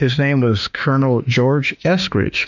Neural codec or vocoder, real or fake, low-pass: autoencoder, 48 kHz, 32 numbers a frame, DAC-VAE, trained on Japanese speech; fake; 7.2 kHz